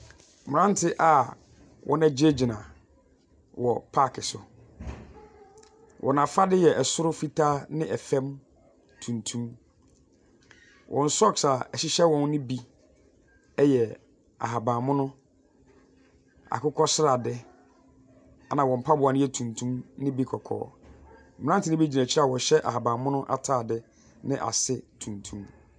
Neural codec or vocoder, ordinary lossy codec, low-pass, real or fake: none; MP3, 96 kbps; 9.9 kHz; real